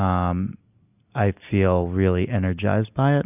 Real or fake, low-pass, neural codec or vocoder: real; 3.6 kHz; none